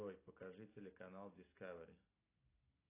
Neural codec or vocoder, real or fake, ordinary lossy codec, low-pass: none; real; AAC, 24 kbps; 3.6 kHz